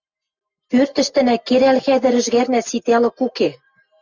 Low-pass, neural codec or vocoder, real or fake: 7.2 kHz; none; real